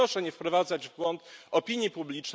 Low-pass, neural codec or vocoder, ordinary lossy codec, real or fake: none; none; none; real